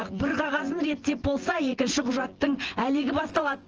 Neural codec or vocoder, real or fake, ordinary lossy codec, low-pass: vocoder, 24 kHz, 100 mel bands, Vocos; fake; Opus, 16 kbps; 7.2 kHz